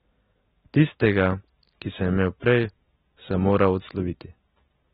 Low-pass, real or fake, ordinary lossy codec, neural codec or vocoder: 19.8 kHz; real; AAC, 16 kbps; none